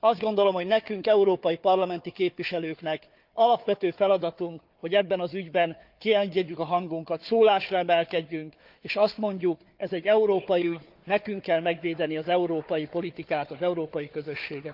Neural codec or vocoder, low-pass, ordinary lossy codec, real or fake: codec, 16 kHz, 4 kbps, FunCodec, trained on Chinese and English, 50 frames a second; 5.4 kHz; Opus, 24 kbps; fake